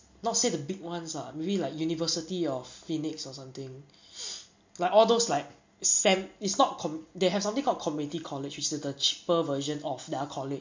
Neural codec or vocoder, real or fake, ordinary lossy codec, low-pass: none; real; MP3, 48 kbps; 7.2 kHz